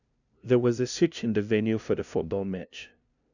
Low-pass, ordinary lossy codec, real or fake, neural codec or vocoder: 7.2 kHz; none; fake; codec, 16 kHz, 0.5 kbps, FunCodec, trained on LibriTTS, 25 frames a second